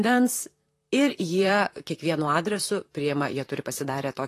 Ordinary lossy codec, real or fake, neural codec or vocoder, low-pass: AAC, 48 kbps; fake; vocoder, 44.1 kHz, 128 mel bands, Pupu-Vocoder; 14.4 kHz